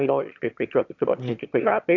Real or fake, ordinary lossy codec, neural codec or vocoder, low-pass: fake; AAC, 48 kbps; autoencoder, 22.05 kHz, a latent of 192 numbers a frame, VITS, trained on one speaker; 7.2 kHz